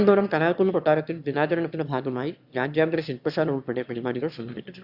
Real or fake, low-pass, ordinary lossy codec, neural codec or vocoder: fake; 5.4 kHz; Opus, 64 kbps; autoencoder, 22.05 kHz, a latent of 192 numbers a frame, VITS, trained on one speaker